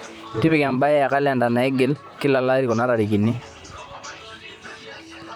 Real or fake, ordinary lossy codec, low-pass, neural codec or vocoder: fake; none; 19.8 kHz; vocoder, 44.1 kHz, 128 mel bands, Pupu-Vocoder